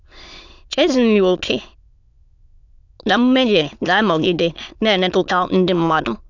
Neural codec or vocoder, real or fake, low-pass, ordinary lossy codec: autoencoder, 22.05 kHz, a latent of 192 numbers a frame, VITS, trained on many speakers; fake; 7.2 kHz; none